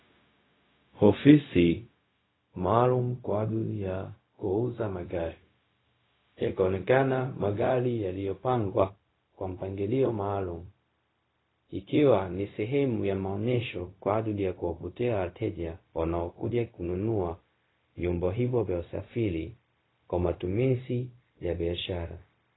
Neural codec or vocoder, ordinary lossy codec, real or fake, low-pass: codec, 16 kHz, 0.4 kbps, LongCat-Audio-Codec; AAC, 16 kbps; fake; 7.2 kHz